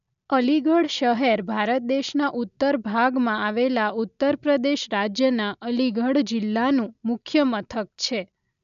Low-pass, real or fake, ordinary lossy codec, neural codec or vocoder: 7.2 kHz; real; none; none